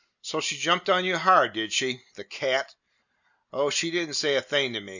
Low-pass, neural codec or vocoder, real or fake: 7.2 kHz; none; real